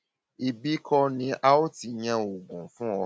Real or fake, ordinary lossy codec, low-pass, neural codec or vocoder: real; none; none; none